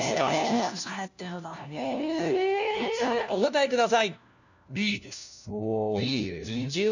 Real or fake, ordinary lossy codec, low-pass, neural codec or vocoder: fake; none; 7.2 kHz; codec, 16 kHz, 1 kbps, FunCodec, trained on LibriTTS, 50 frames a second